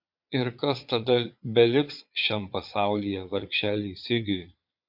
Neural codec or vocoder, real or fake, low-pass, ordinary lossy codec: vocoder, 22.05 kHz, 80 mel bands, Vocos; fake; 5.4 kHz; MP3, 48 kbps